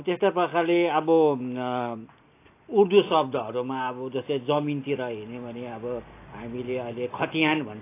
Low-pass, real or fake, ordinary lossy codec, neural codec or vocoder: 3.6 kHz; real; AAC, 24 kbps; none